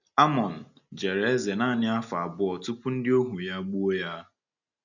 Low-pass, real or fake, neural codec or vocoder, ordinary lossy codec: 7.2 kHz; real; none; none